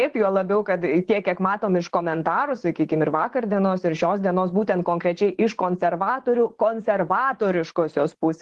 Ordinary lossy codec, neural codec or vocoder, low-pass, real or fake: Opus, 16 kbps; vocoder, 24 kHz, 100 mel bands, Vocos; 10.8 kHz; fake